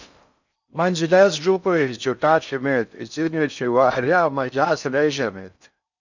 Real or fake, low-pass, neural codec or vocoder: fake; 7.2 kHz; codec, 16 kHz in and 24 kHz out, 0.6 kbps, FocalCodec, streaming, 4096 codes